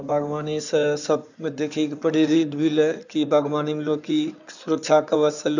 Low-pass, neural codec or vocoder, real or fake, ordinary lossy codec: 7.2 kHz; codec, 16 kHz in and 24 kHz out, 2.2 kbps, FireRedTTS-2 codec; fake; none